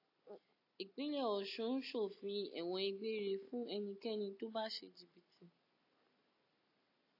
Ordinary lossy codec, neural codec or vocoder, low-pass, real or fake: AAC, 32 kbps; none; 5.4 kHz; real